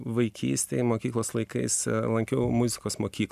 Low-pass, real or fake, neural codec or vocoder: 14.4 kHz; real; none